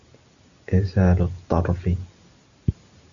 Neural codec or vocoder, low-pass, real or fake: none; 7.2 kHz; real